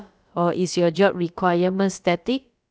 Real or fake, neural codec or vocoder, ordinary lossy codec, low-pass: fake; codec, 16 kHz, about 1 kbps, DyCAST, with the encoder's durations; none; none